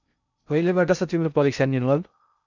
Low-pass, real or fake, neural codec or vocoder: 7.2 kHz; fake; codec, 16 kHz in and 24 kHz out, 0.6 kbps, FocalCodec, streaming, 2048 codes